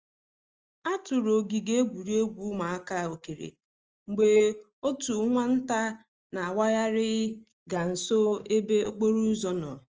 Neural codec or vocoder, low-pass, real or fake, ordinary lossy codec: none; 7.2 kHz; real; Opus, 24 kbps